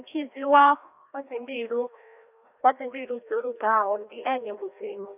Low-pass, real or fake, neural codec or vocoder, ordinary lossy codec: 3.6 kHz; fake; codec, 16 kHz, 1 kbps, FreqCodec, larger model; none